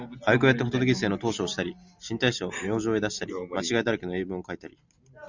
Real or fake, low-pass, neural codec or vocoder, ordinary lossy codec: real; 7.2 kHz; none; Opus, 64 kbps